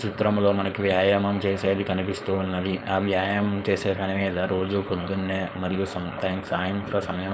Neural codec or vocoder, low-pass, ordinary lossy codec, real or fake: codec, 16 kHz, 4.8 kbps, FACodec; none; none; fake